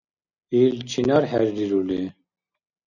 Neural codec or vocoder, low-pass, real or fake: none; 7.2 kHz; real